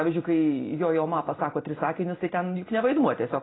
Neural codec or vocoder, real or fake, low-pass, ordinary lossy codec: none; real; 7.2 kHz; AAC, 16 kbps